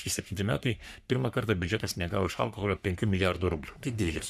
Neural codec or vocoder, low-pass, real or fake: codec, 44.1 kHz, 3.4 kbps, Pupu-Codec; 14.4 kHz; fake